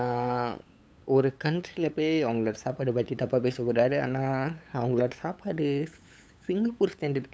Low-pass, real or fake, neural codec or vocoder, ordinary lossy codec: none; fake; codec, 16 kHz, 8 kbps, FunCodec, trained on LibriTTS, 25 frames a second; none